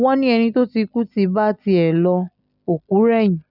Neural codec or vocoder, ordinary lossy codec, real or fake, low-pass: none; none; real; 5.4 kHz